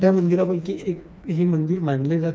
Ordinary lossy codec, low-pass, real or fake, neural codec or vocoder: none; none; fake; codec, 16 kHz, 2 kbps, FreqCodec, smaller model